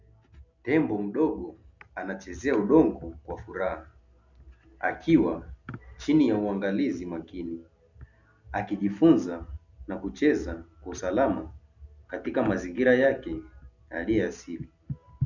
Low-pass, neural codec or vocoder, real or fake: 7.2 kHz; none; real